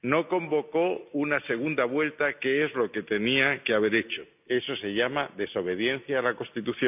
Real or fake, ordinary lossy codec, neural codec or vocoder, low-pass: real; none; none; 3.6 kHz